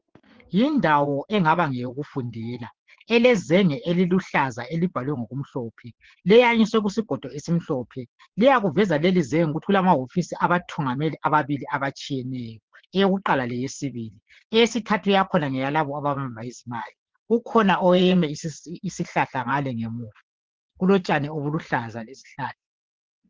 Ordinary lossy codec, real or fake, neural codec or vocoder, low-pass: Opus, 16 kbps; fake; vocoder, 24 kHz, 100 mel bands, Vocos; 7.2 kHz